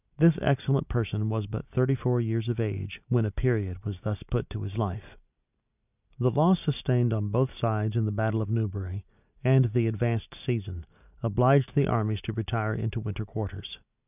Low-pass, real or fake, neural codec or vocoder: 3.6 kHz; real; none